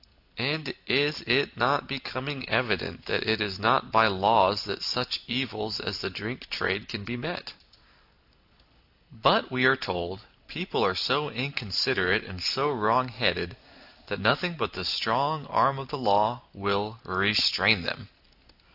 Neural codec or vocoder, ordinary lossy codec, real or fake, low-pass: none; AAC, 48 kbps; real; 5.4 kHz